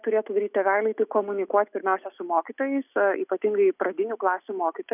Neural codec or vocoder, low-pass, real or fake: none; 3.6 kHz; real